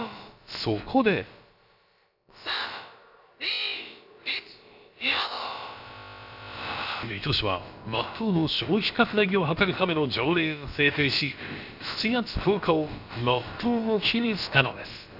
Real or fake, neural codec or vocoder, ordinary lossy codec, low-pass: fake; codec, 16 kHz, about 1 kbps, DyCAST, with the encoder's durations; none; 5.4 kHz